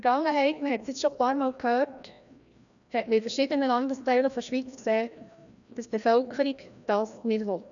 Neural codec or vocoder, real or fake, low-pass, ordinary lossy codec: codec, 16 kHz, 1 kbps, FreqCodec, larger model; fake; 7.2 kHz; none